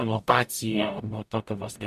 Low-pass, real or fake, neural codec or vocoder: 14.4 kHz; fake; codec, 44.1 kHz, 0.9 kbps, DAC